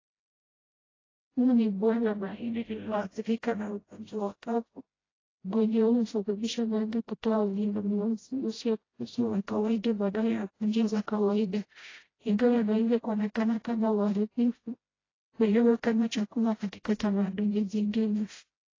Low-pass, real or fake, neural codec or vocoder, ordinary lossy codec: 7.2 kHz; fake; codec, 16 kHz, 0.5 kbps, FreqCodec, smaller model; AAC, 32 kbps